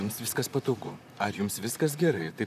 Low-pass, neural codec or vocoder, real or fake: 14.4 kHz; vocoder, 44.1 kHz, 128 mel bands, Pupu-Vocoder; fake